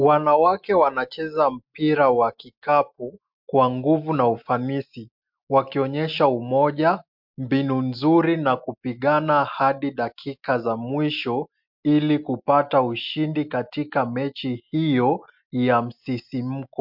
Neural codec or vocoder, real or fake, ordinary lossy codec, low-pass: none; real; MP3, 48 kbps; 5.4 kHz